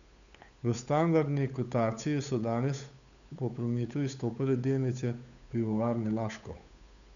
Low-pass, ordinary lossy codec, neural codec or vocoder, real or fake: 7.2 kHz; none; codec, 16 kHz, 8 kbps, FunCodec, trained on Chinese and English, 25 frames a second; fake